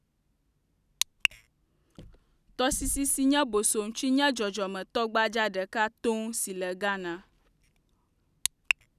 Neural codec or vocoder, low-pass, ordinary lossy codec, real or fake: none; 14.4 kHz; none; real